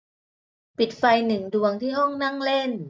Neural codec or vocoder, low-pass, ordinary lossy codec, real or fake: none; none; none; real